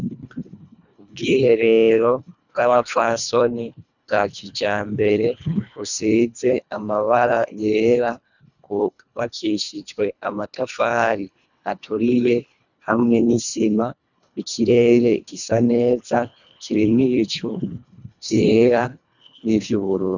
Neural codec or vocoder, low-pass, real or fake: codec, 24 kHz, 1.5 kbps, HILCodec; 7.2 kHz; fake